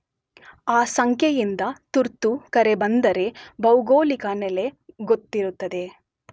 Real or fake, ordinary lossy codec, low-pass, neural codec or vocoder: real; none; none; none